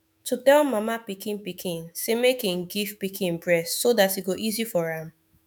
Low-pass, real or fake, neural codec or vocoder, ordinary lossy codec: 19.8 kHz; fake; autoencoder, 48 kHz, 128 numbers a frame, DAC-VAE, trained on Japanese speech; none